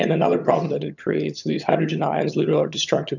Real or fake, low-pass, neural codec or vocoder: fake; 7.2 kHz; vocoder, 22.05 kHz, 80 mel bands, HiFi-GAN